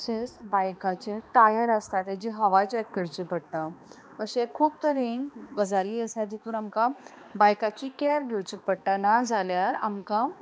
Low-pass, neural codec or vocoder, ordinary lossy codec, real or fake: none; codec, 16 kHz, 2 kbps, X-Codec, HuBERT features, trained on balanced general audio; none; fake